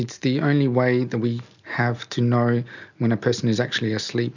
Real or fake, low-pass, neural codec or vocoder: real; 7.2 kHz; none